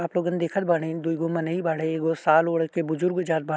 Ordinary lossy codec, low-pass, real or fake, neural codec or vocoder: none; none; real; none